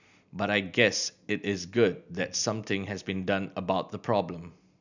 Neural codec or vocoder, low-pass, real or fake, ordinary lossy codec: none; 7.2 kHz; real; none